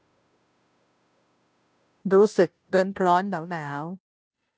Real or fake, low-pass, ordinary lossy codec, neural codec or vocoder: fake; none; none; codec, 16 kHz, 0.5 kbps, FunCodec, trained on Chinese and English, 25 frames a second